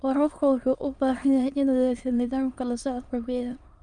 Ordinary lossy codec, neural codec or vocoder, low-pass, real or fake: Opus, 32 kbps; autoencoder, 22.05 kHz, a latent of 192 numbers a frame, VITS, trained on many speakers; 9.9 kHz; fake